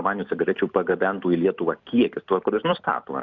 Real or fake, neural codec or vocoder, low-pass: fake; vocoder, 44.1 kHz, 128 mel bands every 256 samples, BigVGAN v2; 7.2 kHz